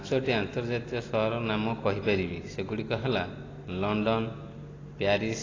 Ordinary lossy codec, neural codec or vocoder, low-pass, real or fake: AAC, 32 kbps; none; 7.2 kHz; real